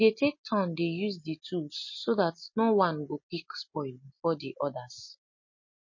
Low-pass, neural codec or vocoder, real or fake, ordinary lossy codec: 7.2 kHz; none; real; MP3, 32 kbps